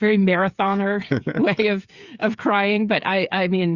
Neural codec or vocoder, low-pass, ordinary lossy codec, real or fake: codec, 16 kHz, 8 kbps, FreqCodec, smaller model; 7.2 kHz; Opus, 64 kbps; fake